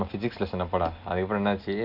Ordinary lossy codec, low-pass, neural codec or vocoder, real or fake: none; 5.4 kHz; none; real